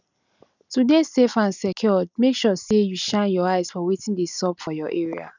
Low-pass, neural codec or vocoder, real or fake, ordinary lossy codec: 7.2 kHz; none; real; none